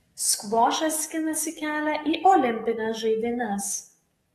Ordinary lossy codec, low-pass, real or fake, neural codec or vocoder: AAC, 32 kbps; 19.8 kHz; fake; codec, 44.1 kHz, 7.8 kbps, DAC